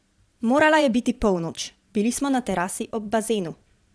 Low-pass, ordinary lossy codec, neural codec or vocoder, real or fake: none; none; vocoder, 22.05 kHz, 80 mel bands, Vocos; fake